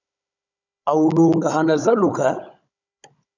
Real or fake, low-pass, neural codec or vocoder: fake; 7.2 kHz; codec, 16 kHz, 16 kbps, FunCodec, trained on Chinese and English, 50 frames a second